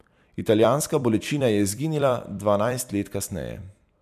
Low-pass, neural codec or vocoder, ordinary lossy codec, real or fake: 14.4 kHz; vocoder, 44.1 kHz, 128 mel bands every 256 samples, BigVGAN v2; MP3, 96 kbps; fake